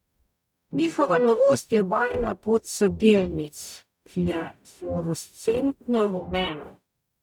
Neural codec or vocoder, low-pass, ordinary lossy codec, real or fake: codec, 44.1 kHz, 0.9 kbps, DAC; 19.8 kHz; none; fake